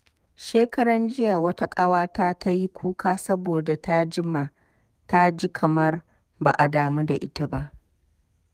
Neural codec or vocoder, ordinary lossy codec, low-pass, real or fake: codec, 32 kHz, 1.9 kbps, SNAC; Opus, 32 kbps; 14.4 kHz; fake